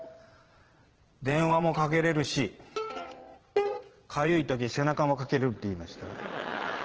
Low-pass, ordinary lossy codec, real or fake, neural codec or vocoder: 7.2 kHz; Opus, 16 kbps; fake; vocoder, 22.05 kHz, 80 mel bands, Vocos